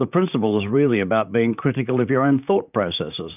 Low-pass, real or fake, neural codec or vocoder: 3.6 kHz; fake; vocoder, 44.1 kHz, 128 mel bands, Pupu-Vocoder